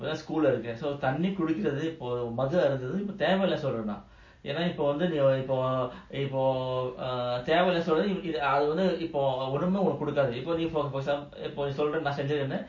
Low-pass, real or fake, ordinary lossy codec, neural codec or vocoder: 7.2 kHz; real; MP3, 32 kbps; none